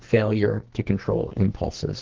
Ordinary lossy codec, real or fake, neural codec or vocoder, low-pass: Opus, 16 kbps; fake; codec, 44.1 kHz, 2.6 kbps, SNAC; 7.2 kHz